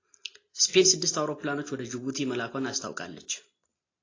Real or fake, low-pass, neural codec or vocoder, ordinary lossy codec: real; 7.2 kHz; none; AAC, 32 kbps